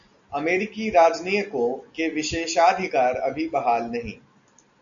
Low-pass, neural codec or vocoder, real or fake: 7.2 kHz; none; real